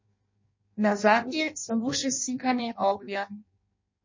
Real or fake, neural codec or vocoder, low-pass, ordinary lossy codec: fake; codec, 16 kHz in and 24 kHz out, 0.6 kbps, FireRedTTS-2 codec; 7.2 kHz; MP3, 32 kbps